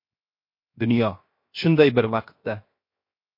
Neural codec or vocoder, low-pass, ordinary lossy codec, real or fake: codec, 16 kHz, 0.7 kbps, FocalCodec; 5.4 kHz; MP3, 32 kbps; fake